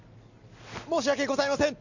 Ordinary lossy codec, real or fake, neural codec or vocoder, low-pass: none; real; none; 7.2 kHz